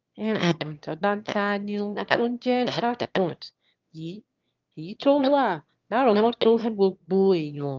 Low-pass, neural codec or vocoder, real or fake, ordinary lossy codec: 7.2 kHz; autoencoder, 22.05 kHz, a latent of 192 numbers a frame, VITS, trained on one speaker; fake; Opus, 32 kbps